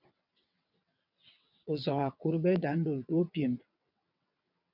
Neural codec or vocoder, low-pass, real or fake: vocoder, 22.05 kHz, 80 mel bands, WaveNeXt; 5.4 kHz; fake